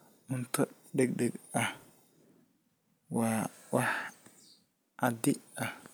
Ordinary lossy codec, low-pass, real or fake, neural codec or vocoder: none; none; real; none